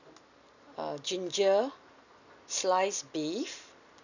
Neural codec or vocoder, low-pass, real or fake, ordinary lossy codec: none; 7.2 kHz; real; none